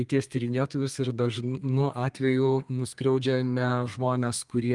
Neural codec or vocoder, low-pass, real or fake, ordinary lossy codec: codec, 32 kHz, 1.9 kbps, SNAC; 10.8 kHz; fake; Opus, 32 kbps